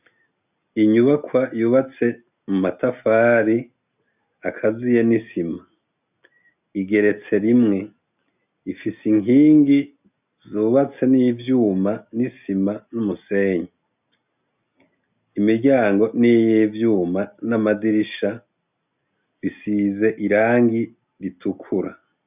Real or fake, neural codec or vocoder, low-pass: real; none; 3.6 kHz